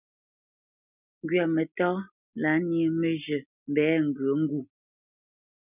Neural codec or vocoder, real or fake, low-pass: none; real; 3.6 kHz